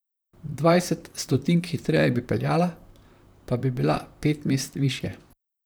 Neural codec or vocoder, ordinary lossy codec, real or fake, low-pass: vocoder, 44.1 kHz, 128 mel bands, Pupu-Vocoder; none; fake; none